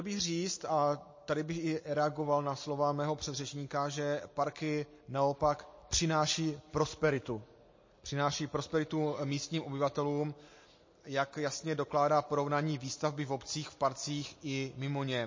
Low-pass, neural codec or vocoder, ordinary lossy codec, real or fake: 7.2 kHz; none; MP3, 32 kbps; real